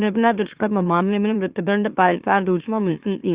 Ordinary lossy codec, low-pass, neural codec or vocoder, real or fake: Opus, 64 kbps; 3.6 kHz; autoencoder, 44.1 kHz, a latent of 192 numbers a frame, MeloTTS; fake